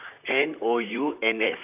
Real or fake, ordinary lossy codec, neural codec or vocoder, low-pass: fake; none; vocoder, 44.1 kHz, 128 mel bands, Pupu-Vocoder; 3.6 kHz